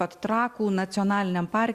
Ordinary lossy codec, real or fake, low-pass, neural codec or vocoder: Opus, 64 kbps; real; 14.4 kHz; none